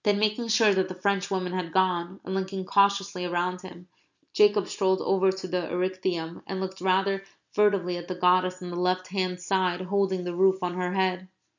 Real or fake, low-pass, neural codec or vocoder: real; 7.2 kHz; none